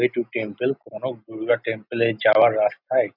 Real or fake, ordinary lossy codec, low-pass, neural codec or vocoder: real; none; 5.4 kHz; none